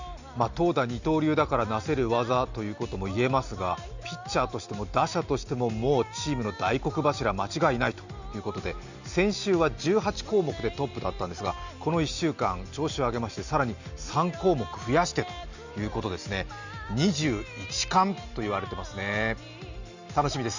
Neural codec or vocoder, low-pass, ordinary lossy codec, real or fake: none; 7.2 kHz; Opus, 64 kbps; real